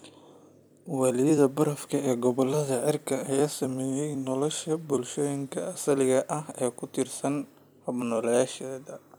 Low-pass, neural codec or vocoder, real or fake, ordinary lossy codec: none; vocoder, 44.1 kHz, 128 mel bands every 256 samples, BigVGAN v2; fake; none